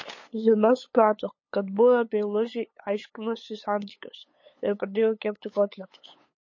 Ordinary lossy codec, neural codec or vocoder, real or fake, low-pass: MP3, 32 kbps; codec, 16 kHz, 8 kbps, FunCodec, trained on LibriTTS, 25 frames a second; fake; 7.2 kHz